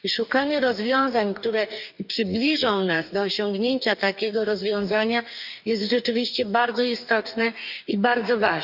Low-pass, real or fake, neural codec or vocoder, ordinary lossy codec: 5.4 kHz; fake; codec, 44.1 kHz, 2.6 kbps, DAC; none